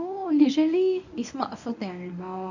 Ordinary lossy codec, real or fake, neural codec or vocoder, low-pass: none; fake; codec, 24 kHz, 0.9 kbps, WavTokenizer, medium speech release version 1; 7.2 kHz